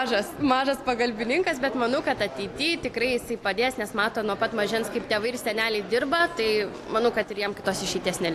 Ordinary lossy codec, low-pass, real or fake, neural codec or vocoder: AAC, 64 kbps; 14.4 kHz; real; none